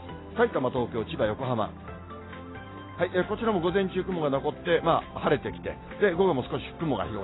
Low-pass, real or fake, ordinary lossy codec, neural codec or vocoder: 7.2 kHz; real; AAC, 16 kbps; none